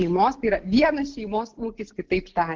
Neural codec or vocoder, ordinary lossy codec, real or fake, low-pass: none; Opus, 16 kbps; real; 7.2 kHz